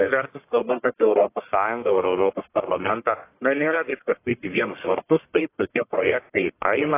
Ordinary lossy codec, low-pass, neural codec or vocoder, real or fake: AAC, 24 kbps; 3.6 kHz; codec, 44.1 kHz, 1.7 kbps, Pupu-Codec; fake